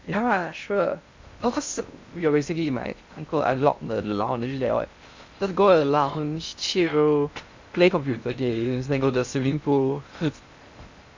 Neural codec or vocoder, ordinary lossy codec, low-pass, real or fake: codec, 16 kHz in and 24 kHz out, 0.6 kbps, FocalCodec, streaming, 2048 codes; MP3, 64 kbps; 7.2 kHz; fake